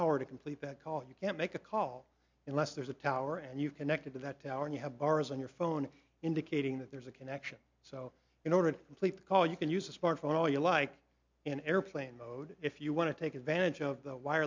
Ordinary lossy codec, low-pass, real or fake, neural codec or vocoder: MP3, 64 kbps; 7.2 kHz; real; none